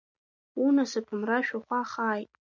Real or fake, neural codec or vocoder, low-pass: real; none; 7.2 kHz